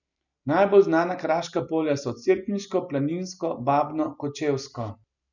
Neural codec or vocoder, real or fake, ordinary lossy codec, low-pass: none; real; none; 7.2 kHz